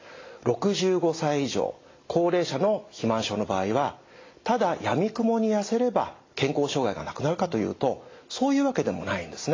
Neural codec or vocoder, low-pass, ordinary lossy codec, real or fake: none; 7.2 kHz; AAC, 32 kbps; real